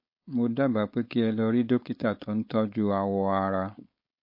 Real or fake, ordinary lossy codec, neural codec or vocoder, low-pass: fake; MP3, 32 kbps; codec, 16 kHz, 4.8 kbps, FACodec; 5.4 kHz